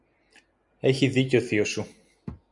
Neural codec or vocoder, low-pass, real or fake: none; 10.8 kHz; real